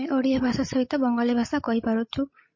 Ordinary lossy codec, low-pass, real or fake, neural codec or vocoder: MP3, 32 kbps; 7.2 kHz; fake; codec, 16 kHz, 16 kbps, FreqCodec, larger model